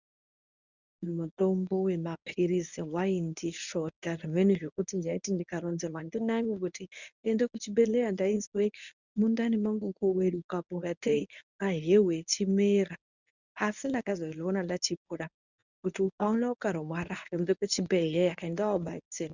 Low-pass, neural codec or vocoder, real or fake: 7.2 kHz; codec, 24 kHz, 0.9 kbps, WavTokenizer, medium speech release version 1; fake